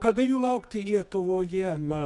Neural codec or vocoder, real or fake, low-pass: codec, 24 kHz, 0.9 kbps, WavTokenizer, medium music audio release; fake; 10.8 kHz